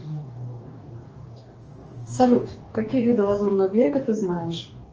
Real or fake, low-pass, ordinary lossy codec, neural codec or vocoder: fake; 7.2 kHz; Opus, 24 kbps; codec, 44.1 kHz, 2.6 kbps, DAC